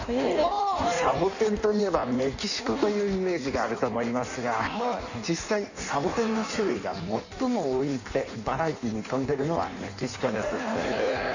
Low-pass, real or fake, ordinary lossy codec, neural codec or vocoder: 7.2 kHz; fake; none; codec, 16 kHz in and 24 kHz out, 1.1 kbps, FireRedTTS-2 codec